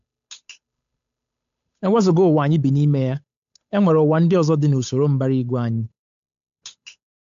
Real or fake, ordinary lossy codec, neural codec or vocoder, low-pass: fake; AAC, 64 kbps; codec, 16 kHz, 8 kbps, FunCodec, trained on Chinese and English, 25 frames a second; 7.2 kHz